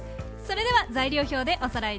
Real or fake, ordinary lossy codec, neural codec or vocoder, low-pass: real; none; none; none